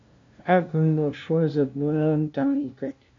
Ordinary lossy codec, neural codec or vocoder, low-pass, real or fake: MP3, 48 kbps; codec, 16 kHz, 0.5 kbps, FunCodec, trained on LibriTTS, 25 frames a second; 7.2 kHz; fake